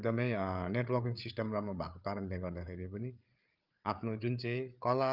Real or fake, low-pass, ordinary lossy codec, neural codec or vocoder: fake; 5.4 kHz; Opus, 32 kbps; codec, 16 kHz, 8 kbps, FreqCodec, larger model